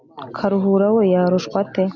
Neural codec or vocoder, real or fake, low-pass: none; real; 7.2 kHz